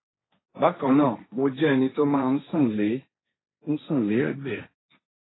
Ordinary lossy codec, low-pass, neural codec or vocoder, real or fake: AAC, 16 kbps; 7.2 kHz; codec, 16 kHz, 1.1 kbps, Voila-Tokenizer; fake